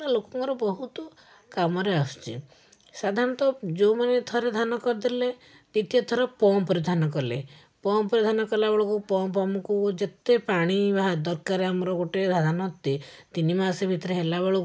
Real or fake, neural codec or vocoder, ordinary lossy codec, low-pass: real; none; none; none